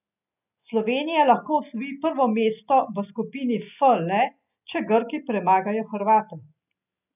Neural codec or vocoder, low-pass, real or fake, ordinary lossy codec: none; 3.6 kHz; real; none